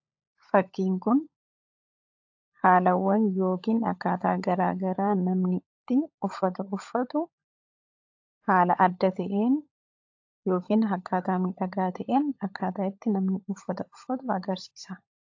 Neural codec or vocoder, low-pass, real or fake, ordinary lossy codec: codec, 16 kHz, 16 kbps, FunCodec, trained on LibriTTS, 50 frames a second; 7.2 kHz; fake; AAC, 48 kbps